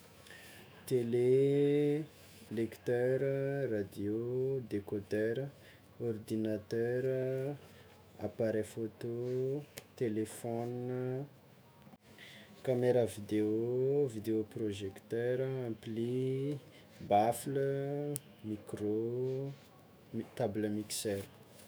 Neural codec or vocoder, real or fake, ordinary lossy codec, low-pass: autoencoder, 48 kHz, 128 numbers a frame, DAC-VAE, trained on Japanese speech; fake; none; none